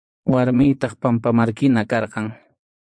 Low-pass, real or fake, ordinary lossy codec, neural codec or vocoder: 9.9 kHz; fake; MP3, 64 kbps; vocoder, 22.05 kHz, 80 mel bands, Vocos